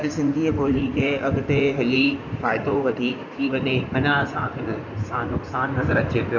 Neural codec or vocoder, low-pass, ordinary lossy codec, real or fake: codec, 16 kHz in and 24 kHz out, 2.2 kbps, FireRedTTS-2 codec; 7.2 kHz; none; fake